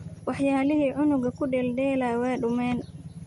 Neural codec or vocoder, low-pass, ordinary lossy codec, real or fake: none; 19.8 kHz; MP3, 48 kbps; real